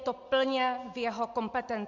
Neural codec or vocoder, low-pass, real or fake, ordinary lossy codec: none; 7.2 kHz; real; MP3, 64 kbps